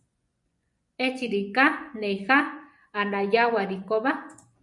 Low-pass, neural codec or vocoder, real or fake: 10.8 kHz; vocoder, 24 kHz, 100 mel bands, Vocos; fake